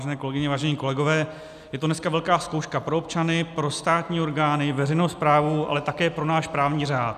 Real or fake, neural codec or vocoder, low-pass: real; none; 14.4 kHz